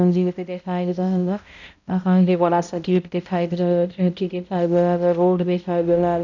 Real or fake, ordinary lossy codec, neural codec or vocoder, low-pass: fake; none; codec, 16 kHz, 0.5 kbps, X-Codec, HuBERT features, trained on balanced general audio; 7.2 kHz